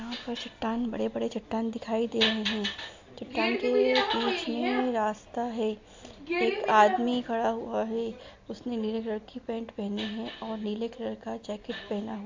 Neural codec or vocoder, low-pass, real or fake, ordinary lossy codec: none; 7.2 kHz; real; MP3, 48 kbps